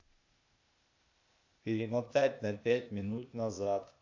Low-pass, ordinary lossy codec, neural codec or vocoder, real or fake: 7.2 kHz; AAC, 48 kbps; codec, 16 kHz, 0.8 kbps, ZipCodec; fake